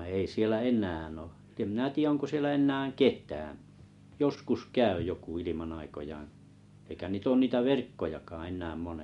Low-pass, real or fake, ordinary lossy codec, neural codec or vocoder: 10.8 kHz; real; MP3, 64 kbps; none